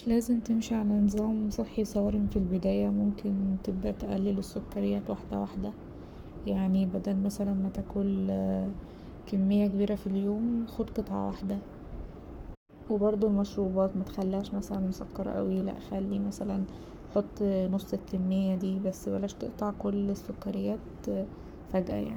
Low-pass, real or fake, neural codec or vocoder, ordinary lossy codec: none; fake; codec, 44.1 kHz, 7.8 kbps, Pupu-Codec; none